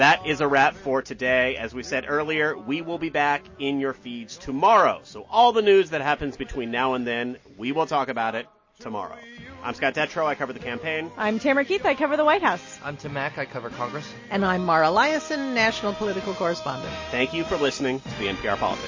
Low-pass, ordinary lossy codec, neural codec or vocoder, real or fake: 7.2 kHz; MP3, 32 kbps; none; real